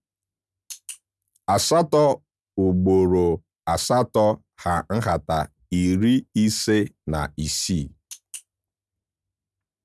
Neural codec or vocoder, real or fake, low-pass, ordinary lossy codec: none; real; none; none